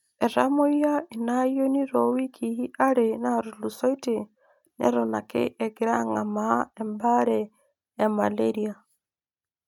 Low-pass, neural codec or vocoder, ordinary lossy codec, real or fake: 19.8 kHz; none; none; real